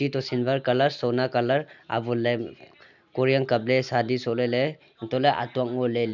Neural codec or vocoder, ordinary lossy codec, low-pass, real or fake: none; none; 7.2 kHz; real